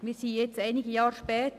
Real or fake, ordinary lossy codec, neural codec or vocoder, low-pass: real; none; none; 14.4 kHz